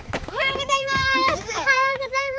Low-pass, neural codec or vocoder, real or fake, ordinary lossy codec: none; codec, 16 kHz, 4 kbps, X-Codec, HuBERT features, trained on balanced general audio; fake; none